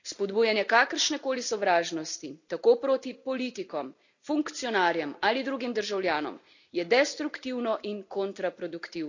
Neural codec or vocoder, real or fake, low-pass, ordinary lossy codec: none; real; 7.2 kHz; none